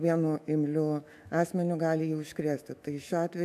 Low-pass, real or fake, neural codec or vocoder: 14.4 kHz; fake; autoencoder, 48 kHz, 128 numbers a frame, DAC-VAE, trained on Japanese speech